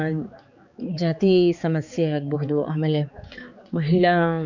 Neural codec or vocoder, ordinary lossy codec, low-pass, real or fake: codec, 16 kHz, 4 kbps, X-Codec, HuBERT features, trained on balanced general audio; none; 7.2 kHz; fake